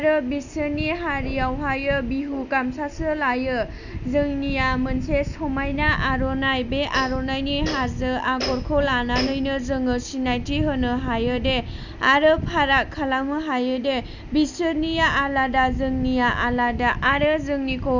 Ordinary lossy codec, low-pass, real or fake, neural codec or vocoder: none; 7.2 kHz; real; none